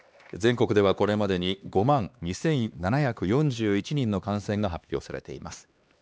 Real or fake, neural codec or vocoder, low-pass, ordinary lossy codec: fake; codec, 16 kHz, 2 kbps, X-Codec, HuBERT features, trained on LibriSpeech; none; none